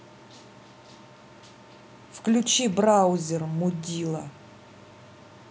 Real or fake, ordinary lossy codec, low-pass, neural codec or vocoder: real; none; none; none